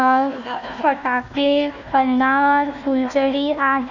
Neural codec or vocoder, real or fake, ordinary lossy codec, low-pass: codec, 16 kHz, 1 kbps, FunCodec, trained on Chinese and English, 50 frames a second; fake; none; 7.2 kHz